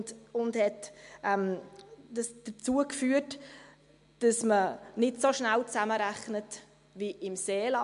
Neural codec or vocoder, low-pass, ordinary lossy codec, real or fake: none; 10.8 kHz; MP3, 64 kbps; real